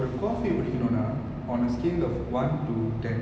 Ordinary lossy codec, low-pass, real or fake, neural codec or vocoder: none; none; real; none